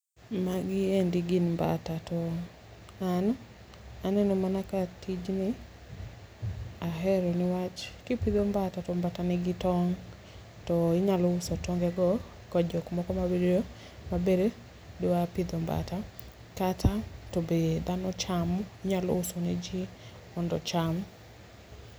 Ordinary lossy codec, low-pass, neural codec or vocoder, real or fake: none; none; none; real